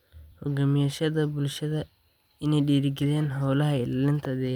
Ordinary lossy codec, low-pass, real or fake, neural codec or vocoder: none; 19.8 kHz; fake; vocoder, 44.1 kHz, 128 mel bands every 256 samples, BigVGAN v2